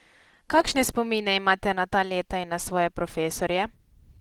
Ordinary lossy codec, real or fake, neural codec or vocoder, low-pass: Opus, 16 kbps; real; none; 19.8 kHz